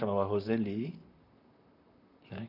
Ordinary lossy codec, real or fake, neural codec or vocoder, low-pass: none; fake; vocoder, 44.1 kHz, 128 mel bands, Pupu-Vocoder; 5.4 kHz